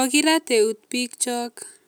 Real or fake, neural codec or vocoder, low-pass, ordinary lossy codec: real; none; none; none